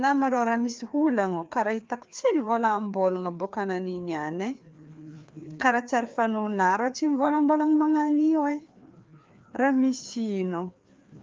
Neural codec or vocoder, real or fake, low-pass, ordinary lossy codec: codec, 16 kHz, 2 kbps, FreqCodec, larger model; fake; 7.2 kHz; Opus, 32 kbps